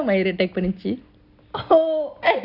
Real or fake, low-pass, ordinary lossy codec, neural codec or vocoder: real; 5.4 kHz; none; none